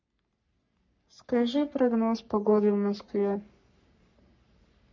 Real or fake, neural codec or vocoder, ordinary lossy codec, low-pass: fake; codec, 44.1 kHz, 3.4 kbps, Pupu-Codec; MP3, 48 kbps; 7.2 kHz